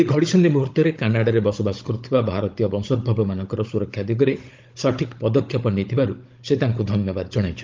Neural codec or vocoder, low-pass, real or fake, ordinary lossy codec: codec, 16 kHz, 16 kbps, FunCodec, trained on Chinese and English, 50 frames a second; 7.2 kHz; fake; Opus, 24 kbps